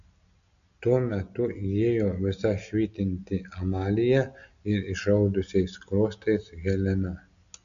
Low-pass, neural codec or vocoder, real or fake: 7.2 kHz; none; real